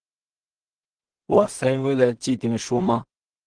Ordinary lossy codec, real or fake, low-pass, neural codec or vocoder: Opus, 24 kbps; fake; 9.9 kHz; codec, 16 kHz in and 24 kHz out, 0.4 kbps, LongCat-Audio-Codec, two codebook decoder